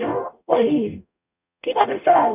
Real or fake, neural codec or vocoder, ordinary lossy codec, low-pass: fake; codec, 44.1 kHz, 0.9 kbps, DAC; none; 3.6 kHz